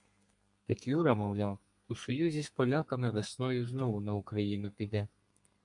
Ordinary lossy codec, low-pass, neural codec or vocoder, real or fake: MP3, 64 kbps; 10.8 kHz; codec, 32 kHz, 1.9 kbps, SNAC; fake